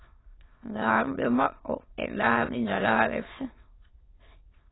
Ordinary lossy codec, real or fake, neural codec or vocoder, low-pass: AAC, 16 kbps; fake; autoencoder, 22.05 kHz, a latent of 192 numbers a frame, VITS, trained on many speakers; 7.2 kHz